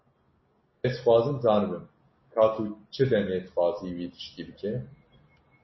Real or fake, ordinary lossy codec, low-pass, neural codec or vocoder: real; MP3, 24 kbps; 7.2 kHz; none